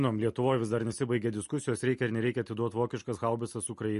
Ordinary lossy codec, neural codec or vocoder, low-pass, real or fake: MP3, 48 kbps; none; 14.4 kHz; real